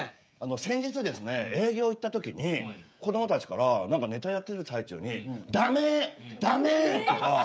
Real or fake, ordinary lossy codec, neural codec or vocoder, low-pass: fake; none; codec, 16 kHz, 16 kbps, FreqCodec, smaller model; none